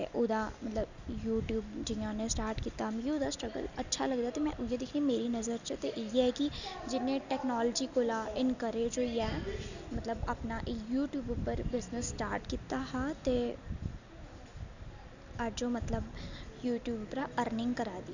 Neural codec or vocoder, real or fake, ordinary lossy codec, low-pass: none; real; none; 7.2 kHz